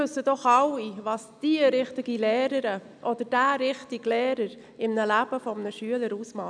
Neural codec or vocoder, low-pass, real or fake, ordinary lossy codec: none; 9.9 kHz; real; none